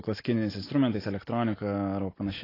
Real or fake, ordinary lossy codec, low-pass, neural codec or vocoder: real; AAC, 24 kbps; 5.4 kHz; none